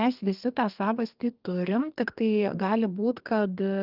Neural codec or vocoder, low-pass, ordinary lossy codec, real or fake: codec, 32 kHz, 1.9 kbps, SNAC; 5.4 kHz; Opus, 32 kbps; fake